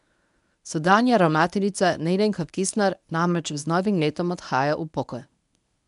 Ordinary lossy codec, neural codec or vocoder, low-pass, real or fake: none; codec, 24 kHz, 0.9 kbps, WavTokenizer, medium speech release version 1; 10.8 kHz; fake